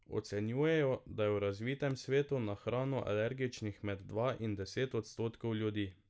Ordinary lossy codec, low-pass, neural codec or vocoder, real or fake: none; none; none; real